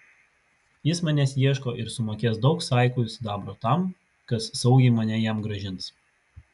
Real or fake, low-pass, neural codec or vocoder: real; 10.8 kHz; none